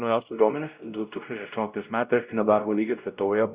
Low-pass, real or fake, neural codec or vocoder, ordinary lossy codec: 3.6 kHz; fake; codec, 16 kHz, 0.5 kbps, X-Codec, WavLM features, trained on Multilingual LibriSpeech; Opus, 64 kbps